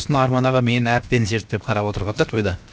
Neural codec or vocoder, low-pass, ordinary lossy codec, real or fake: codec, 16 kHz, 0.7 kbps, FocalCodec; none; none; fake